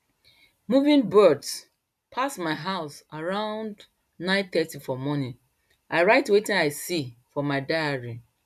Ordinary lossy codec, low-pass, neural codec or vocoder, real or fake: none; 14.4 kHz; none; real